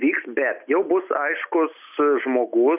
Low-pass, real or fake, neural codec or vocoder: 3.6 kHz; real; none